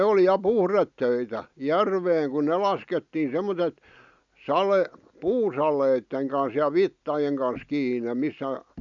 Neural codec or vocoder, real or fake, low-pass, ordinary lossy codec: none; real; 7.2 kHz; none